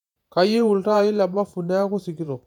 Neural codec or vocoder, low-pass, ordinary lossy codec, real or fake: vocoder, 48 kHz, 128 mel bands, Vocos; 19.8 kHz; none; fake